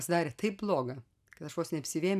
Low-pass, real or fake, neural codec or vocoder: 14.4 kHz; real; none